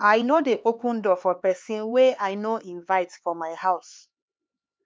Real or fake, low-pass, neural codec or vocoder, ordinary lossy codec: fake; none; codec, 16 kHz, 4 kbps, X-Codec, HuBERT features, trained on LibriSpeech; none